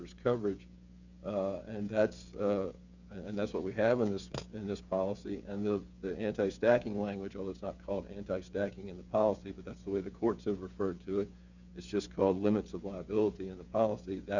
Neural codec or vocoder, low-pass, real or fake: codec, 16 kHz, 8 kbps, FreqCodec, smaller model; 7.2 kHz; fake